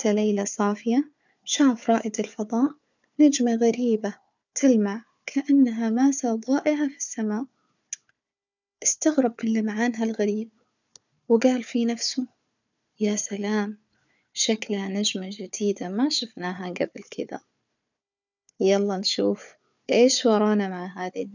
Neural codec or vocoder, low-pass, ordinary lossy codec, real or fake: codec, 16 kHz, 4 kbps, FunCodec, trained on Chinese and English, 50 frames a second; 7.2 kHz; none; fake